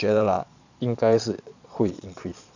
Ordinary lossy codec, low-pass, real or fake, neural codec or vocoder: none; 7.2 kHz; fake; vocoder, 22.05 kHz, 80 mel bands, Vocos